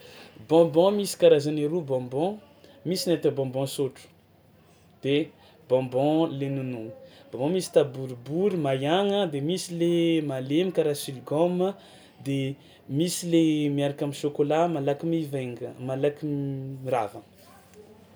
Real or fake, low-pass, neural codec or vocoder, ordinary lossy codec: real; none; none; none